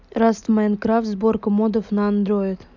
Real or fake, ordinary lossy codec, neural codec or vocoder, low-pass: real; none; none; 7.2 kHz